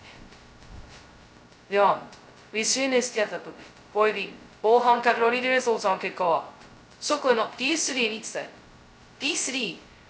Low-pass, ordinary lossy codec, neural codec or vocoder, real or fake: none; none; codec, 16 kHz, 0.2 kbps, FocalCodec; fake